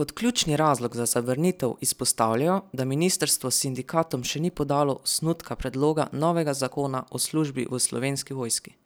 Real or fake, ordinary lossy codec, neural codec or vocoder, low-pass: real; none; none; none